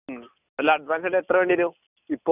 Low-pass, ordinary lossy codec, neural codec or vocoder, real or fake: 3.6 kHz; none; none; real